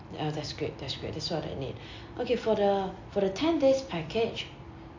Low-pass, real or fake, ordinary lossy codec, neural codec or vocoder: 7.2 kHz; real; AAC, 48 kbps; none